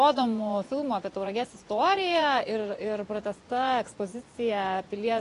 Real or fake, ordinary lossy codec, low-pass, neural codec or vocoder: fake; AAC, 48 kbps; 10.8 kHz; vocoder, 24 kHz, 100 mel bands, Vocos